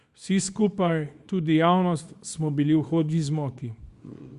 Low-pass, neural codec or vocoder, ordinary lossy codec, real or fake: 10.8 kHz; codec, 24 kHz, 0.9 kbps, WavTokenizer, small release; Opus, 64 kbps; fake